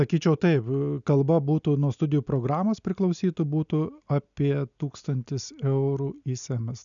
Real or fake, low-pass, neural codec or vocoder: real; 7.2 kHz; none